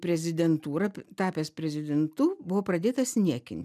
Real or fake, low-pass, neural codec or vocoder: real; 14.4 kHz; none